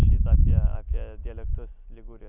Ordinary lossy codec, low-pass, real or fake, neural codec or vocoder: Opus, 64 kbps; 3.6 kHz; real; none